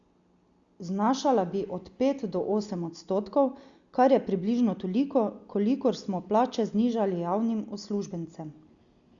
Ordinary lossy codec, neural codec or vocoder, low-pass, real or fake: Opus, 64 kbps; none; 7.2 kHz; real